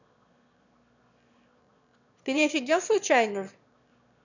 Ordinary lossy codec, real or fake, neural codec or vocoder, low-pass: none; fake; autoencoder, 22.05 kHz, a latent of 192 numbers a frame, VITS, trained on one speaker; 7.2 kHz